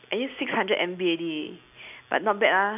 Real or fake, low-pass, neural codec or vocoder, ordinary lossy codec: real; 3.6 kHz; none; none